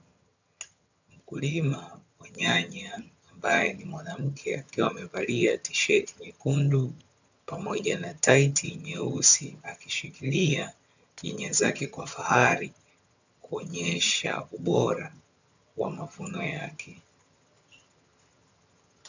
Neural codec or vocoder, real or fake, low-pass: vocoder, 22.05 kHz, 80 mel bands, HiFi-GAN; fake; 7.2 kHz